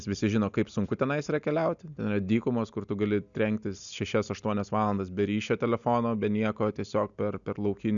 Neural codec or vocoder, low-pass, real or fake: none; 7.2 kHz; real